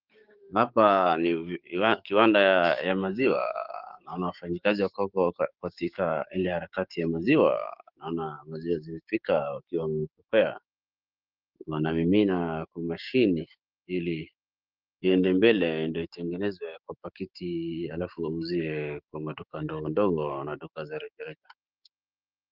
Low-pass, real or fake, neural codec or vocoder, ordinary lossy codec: 5.4 kHz; fake; codec, 16 kHz, 6 kbps, DAC; Opus, 32 kbps